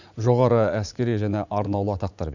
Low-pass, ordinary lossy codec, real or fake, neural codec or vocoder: 7.2 kHz; none; fake; vocoder, 44.1 kHz, 80 mel bands, Vocos